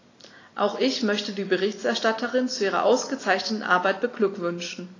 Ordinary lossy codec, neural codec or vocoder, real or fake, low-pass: AAC, 32 kbps; none; real; 7.2 kHz